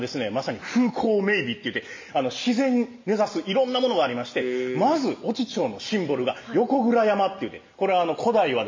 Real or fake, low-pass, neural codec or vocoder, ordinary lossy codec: real; 7.2 kHz; none; MP3, 32 kbps